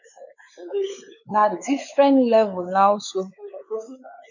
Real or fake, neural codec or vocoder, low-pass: fake; codec, 16 kHz, 4 kbps, X-Codec, WavLM features, trained on Multilingual LibriSpeech; 7.2 kHz